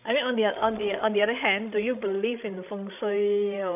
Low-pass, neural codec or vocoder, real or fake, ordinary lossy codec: 3.6 kHz; codec, 16 kHz, 16 kbps, FreqCodec, larger model; fake; AAC, 32 kbps